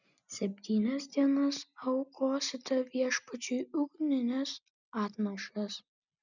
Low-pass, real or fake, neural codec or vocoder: 7.2 kHz; real; none